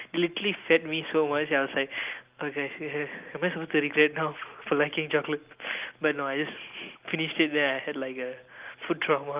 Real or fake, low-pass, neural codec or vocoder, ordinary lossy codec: real; 3.6 kHz; none; Opus, 24 kbps